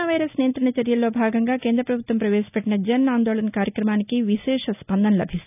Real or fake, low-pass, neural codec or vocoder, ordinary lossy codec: real; 3.6 kHz; none; none